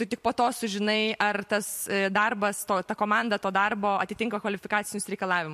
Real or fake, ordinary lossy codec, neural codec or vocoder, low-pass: fake; MP3, 64 kbps; vocoder, 44.1 kHz, 128 mel bands every 256 samples, BigVGAN v2; 14.4 kHz